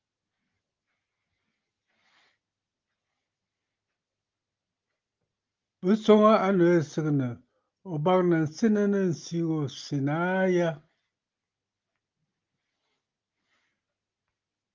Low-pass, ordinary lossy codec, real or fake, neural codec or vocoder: 7.2 kHz; Opus, 32 kbps; real; none